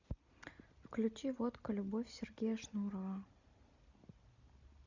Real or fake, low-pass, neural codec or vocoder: real; 7.2 kHz; none